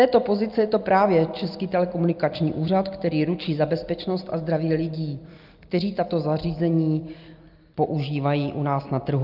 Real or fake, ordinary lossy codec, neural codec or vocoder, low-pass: real; Opus, 24 kbps; none; 5.4 kHz